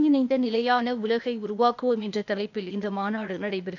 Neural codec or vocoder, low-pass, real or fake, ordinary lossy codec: codec, 16 kHz, 0.8 kbps, ZipCodec; 7.2 kHz; fake; none